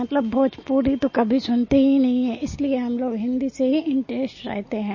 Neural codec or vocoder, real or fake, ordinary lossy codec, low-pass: none; real; MP3, 32 kbps; 7.2 kHz